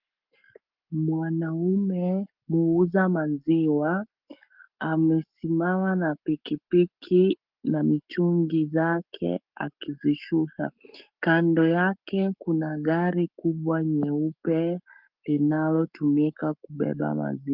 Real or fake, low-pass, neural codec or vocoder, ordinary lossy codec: fake; 5.4 kHz; codec, 44.1 kHz, 7.8 kbps, Pupu-Codec; Opus, 24 kbps